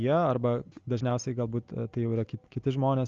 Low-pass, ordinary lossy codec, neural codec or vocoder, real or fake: 7.2 kHz; Opus, 32 kbps; none; real